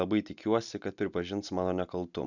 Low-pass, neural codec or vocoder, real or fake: 7.2 kHz; none; real